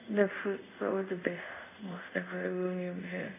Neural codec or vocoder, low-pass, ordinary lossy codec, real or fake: codec, 24 kHz, 0.5 kbps, DualCodec; 3.6 kHz; none; fake